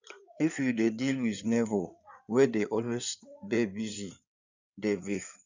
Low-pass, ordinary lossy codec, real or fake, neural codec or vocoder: 7.2 kHz; none; fake; codec, 16 kHz in and 24 kHz out, 2.2 kbps, FireRedTTS-2 codec